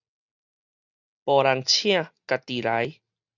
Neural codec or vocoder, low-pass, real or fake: none; 7.2 kHz; real